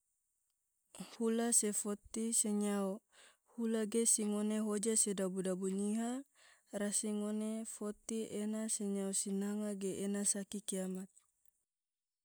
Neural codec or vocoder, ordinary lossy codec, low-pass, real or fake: none; none; none; real